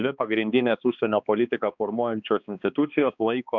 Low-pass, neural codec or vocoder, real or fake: 7.2 kHz; codec, 16 kHz, 2 kbps, X-Codec, HuBERT features, trained on balanced general audio; fake